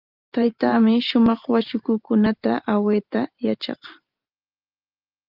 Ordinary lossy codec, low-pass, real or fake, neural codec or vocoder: Opus, 32 kbps; 5.4 kHz; fake; vocoder, 44.1 kHz, 128 mel bands every 512 samples, BigVGAN v2